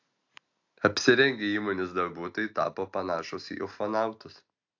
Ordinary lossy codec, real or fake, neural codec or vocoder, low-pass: AAC, 48 kbps; real; none; 7.2 kHz